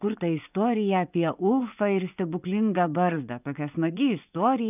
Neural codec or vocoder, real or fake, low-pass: vocoder, 24 kHz, 100 mel bands, Vocos; fake; 3.6 kHz